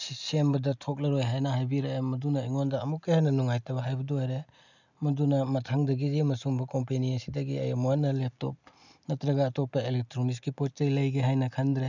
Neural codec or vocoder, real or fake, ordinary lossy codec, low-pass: none; real; none; 7.2 kHz